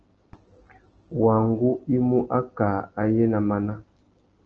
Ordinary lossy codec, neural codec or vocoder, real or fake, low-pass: Opus, 16 kbps; none; real; 7.2 kHz